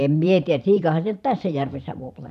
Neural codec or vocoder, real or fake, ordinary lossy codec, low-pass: vocoder, 44.1 kHz, 128 mel bands every 512 samples, BigVGAN v2; fake; none; 14.4 kHz